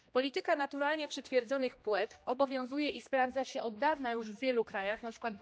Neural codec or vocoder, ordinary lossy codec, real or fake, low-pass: codec, 16 kHz, 1 kbps, X-Codec, HuBERT features, trained on general audio; none; fake; none